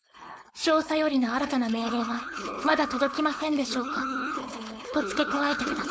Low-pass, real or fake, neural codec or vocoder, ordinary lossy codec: none; fake; codec, 16 kHz, 4.8 kbps, FACodec; none